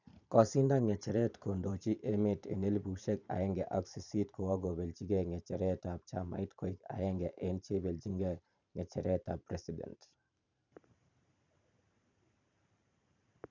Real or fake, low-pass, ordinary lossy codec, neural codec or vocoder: fake; 7.2 kHz; none; vocoder, 44.1 kHz, 128 mel bands every 512 samples, BigVGAN v2